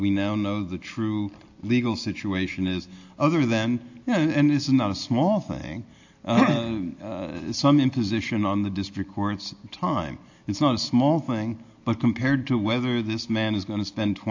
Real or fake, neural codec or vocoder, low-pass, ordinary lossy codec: real; none; 7.2 kHz; AAC, 48 kbps